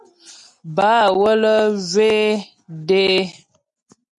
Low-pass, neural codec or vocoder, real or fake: 10.8 kHz; none; real